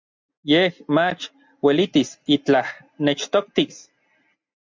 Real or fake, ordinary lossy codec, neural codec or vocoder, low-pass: real; MP3, 64 kbps; none; 7.2 kHz